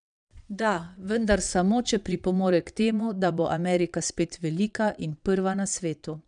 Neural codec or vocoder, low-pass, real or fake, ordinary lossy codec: vocoder, 22.05 kHz, 80 mel bands, Vocos; 9.9 kHz; fake; none